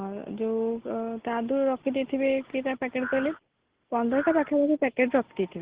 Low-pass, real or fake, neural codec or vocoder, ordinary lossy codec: 3.6 kHz; real; none; Opus, 16 kbps